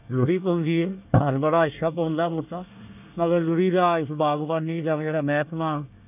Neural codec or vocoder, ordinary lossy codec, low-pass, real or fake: codec, 24 kHz, 1 kbps, SNAC; none; 3.6 kHz; fake